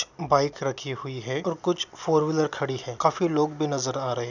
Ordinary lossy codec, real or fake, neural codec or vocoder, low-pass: none; real; none; 7.2 kHz